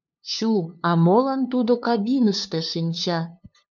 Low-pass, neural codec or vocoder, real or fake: 7.2 kHz; codec, 16 kHz, 2 kbps, FunCodec, trained on LibriTTS, 25 frames a second; fake